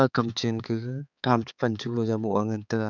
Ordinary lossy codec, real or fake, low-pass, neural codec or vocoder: none; fake; 7.2 kHz; codec, 16 kHz, 4 kbps, X-Codec, HuBERT features, trained on balanced general audio